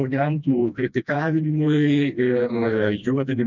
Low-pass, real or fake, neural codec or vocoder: 7.2 kHz; fake; codec, 16 kHz, 1 kbps, FreqCodec, smaller model